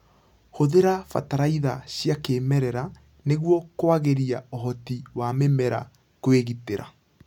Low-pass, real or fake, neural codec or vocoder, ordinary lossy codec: 19.8 kHz; real; none; none